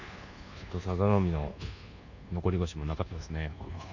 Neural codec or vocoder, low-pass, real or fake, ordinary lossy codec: codec, 24 kHz, 1.2 kbps, DualCodec; 7.2 kHz; fake; none